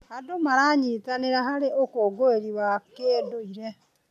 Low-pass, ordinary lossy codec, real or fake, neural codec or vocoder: 14.4 kHz; none; real; none